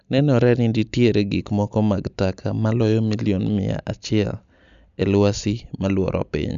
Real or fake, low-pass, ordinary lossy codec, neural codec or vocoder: real; 7.2 kHz; none; none